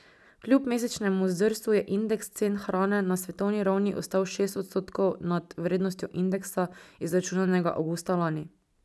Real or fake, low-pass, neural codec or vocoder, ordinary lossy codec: real; none; none; none